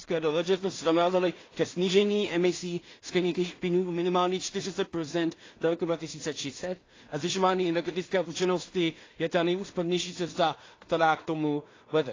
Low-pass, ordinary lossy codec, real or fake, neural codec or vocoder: 7.2 kHz; AAC, 32 kbps; fake; codec, 16 kHz in and 24 kHz out, 0.4 kbps, LongCat-Audio-Codec, two codebook decoder